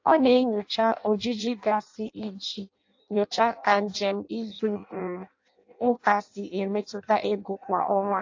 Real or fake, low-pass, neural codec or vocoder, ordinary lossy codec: fake; 7.2 kHz; codec, 16 kHz in and 24 kHz out, 0.6 kbps, FireRedTTS-2 codec; AAC, 48 kbps